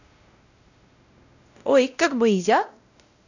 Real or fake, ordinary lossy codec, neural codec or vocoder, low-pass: fake; none; codec, 16 kHz, 0.5 kbps, X-Codec, WavLM features, trained on Multilingual LibriSpeech; 7.2 kHz